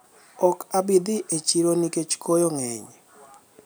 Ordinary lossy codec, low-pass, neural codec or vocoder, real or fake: none; none; none; real